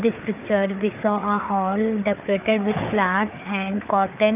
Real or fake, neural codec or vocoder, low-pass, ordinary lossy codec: fake; codec, 16 kHz, 4 kbps, FreqCodec, larger model; 3.6 kHz; none